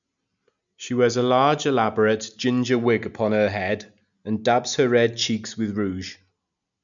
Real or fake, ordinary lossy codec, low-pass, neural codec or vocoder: real; none; 7.2 kHz; none